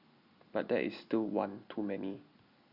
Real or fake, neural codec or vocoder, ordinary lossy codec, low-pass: real; none; Opus, 64 kbps; 5.4 kHz